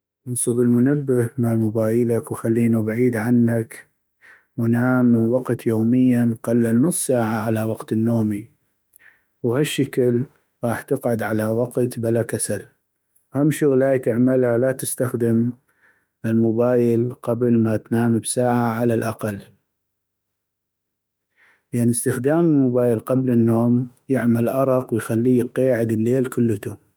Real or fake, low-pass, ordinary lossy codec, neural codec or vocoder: fake; none; none; autoencoder, 48 kHz, 32 numbers a frame, DAC-VAE, trained on Japanese speech